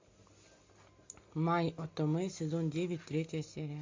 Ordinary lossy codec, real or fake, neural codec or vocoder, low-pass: MP3, 48 kbps; real; none; 7.2 kHz